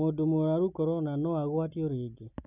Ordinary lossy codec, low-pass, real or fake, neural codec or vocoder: none; 3.6 kHz; real; none